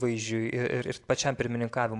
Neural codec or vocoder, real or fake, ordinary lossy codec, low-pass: none; real; AAC, 64 kbps; 10.8 kHz